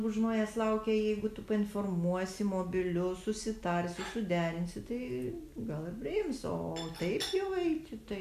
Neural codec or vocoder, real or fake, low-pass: none; real; 14.4 kHz